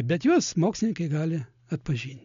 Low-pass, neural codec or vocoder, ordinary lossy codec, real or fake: 7.2 kHz; none; MP3, 64 kbps; real